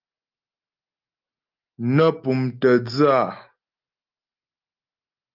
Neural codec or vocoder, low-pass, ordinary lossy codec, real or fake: none; 5.4 kHz; Opus, 32 kbps; real